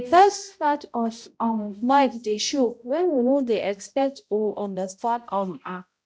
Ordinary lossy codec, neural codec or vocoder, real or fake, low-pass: none; codec, 16 kHz, 0.5 kbps, X-Codec, HuBERT features, trained on balanced general audio; fake; none